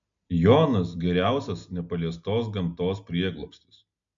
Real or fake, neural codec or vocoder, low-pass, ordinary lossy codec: real; none; 7.2 kHz; AAC, 64 kbps